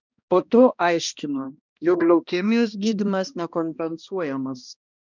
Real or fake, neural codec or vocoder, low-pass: fake; codec, 16 kHz, 1 kbps, X-Codec, HuBERT features, trained on balanced general audio; 7.2 kHz